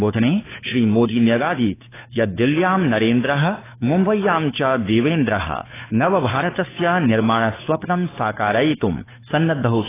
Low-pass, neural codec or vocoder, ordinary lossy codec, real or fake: 3.6 kHz; codec, 16 kHz, 2 kbps, FunCodec, trained on Chinese and English, 25 frames a second; AAC, 16 kbps; fake